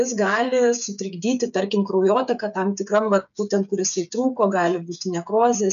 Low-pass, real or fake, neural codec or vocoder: 7.2 kHz; fake; codec, 16 kHz, 8 kbps, FreqCodec, smaller model